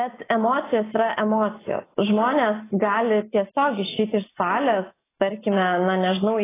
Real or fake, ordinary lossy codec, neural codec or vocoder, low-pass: real; AAC, 16 kbps; none; 3.6 kHz